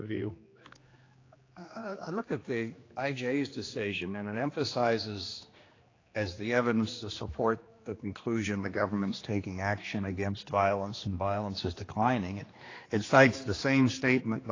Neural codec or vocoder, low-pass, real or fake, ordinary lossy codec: codec, 16 kHz, 2 kbps, X-Codec, HuBERT features, trained on general audio; 7.2 kHz; fake; AAC, 32 kbps